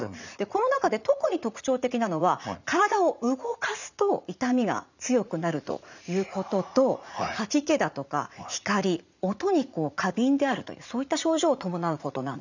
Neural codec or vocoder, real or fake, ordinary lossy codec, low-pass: vocoder, 44.1 kHz, 80 mel bands, Vocos; fake; none; 7.2 kHz